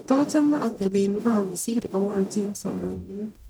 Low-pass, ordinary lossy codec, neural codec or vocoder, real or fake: none; none; codec, 44.1 kHz, 0.9 kbps, DAC; fake